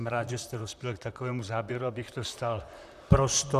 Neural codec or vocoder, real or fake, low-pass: vocoder, 44.1 kHz, 128 mel bands, Pupu-Vocoder; fake; 14.4 kHz